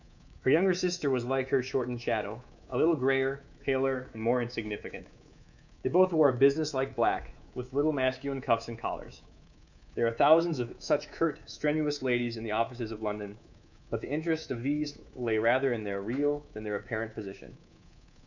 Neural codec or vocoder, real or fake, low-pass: codec, 24 kHz, 3.1 kbps, DualCodec; fake; 7.2 kHz